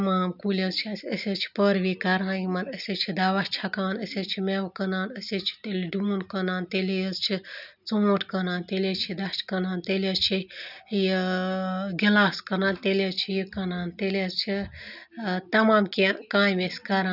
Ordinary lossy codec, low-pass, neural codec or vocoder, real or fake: none; 5.4 kHz; none; real